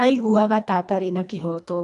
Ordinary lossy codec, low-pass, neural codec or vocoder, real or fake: none; 10.8 kHz; codec, 24 kHz, 1.5 kbps, HILCodec; fake